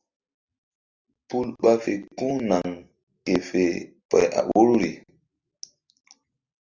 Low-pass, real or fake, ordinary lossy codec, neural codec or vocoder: 7.2 kHz; real; Opus, 64 kbps; none